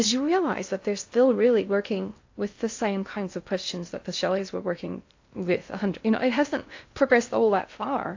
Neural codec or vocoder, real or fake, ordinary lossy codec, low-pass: codec, 16 kHz in and 24 kHz out, 0.6 kbps, FocalCodec, streaming, 2048 codes; fake; AAC, 48 kbps; 7.2 kHz